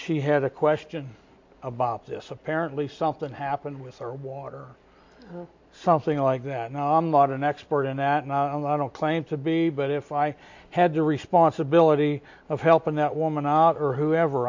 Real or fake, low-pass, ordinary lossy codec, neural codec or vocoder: real; 7.2 kHz; MP3, 48 kbps; none